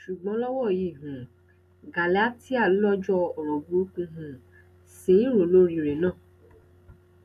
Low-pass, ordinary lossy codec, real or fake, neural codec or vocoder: 14.4 kHz; none; real; none